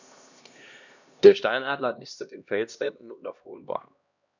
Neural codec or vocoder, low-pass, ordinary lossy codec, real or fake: codec, 16 kHz, 1 kbps, X-Codec, HuBERT features, trained on LibriSpeech; 7.2 kHz; none; fake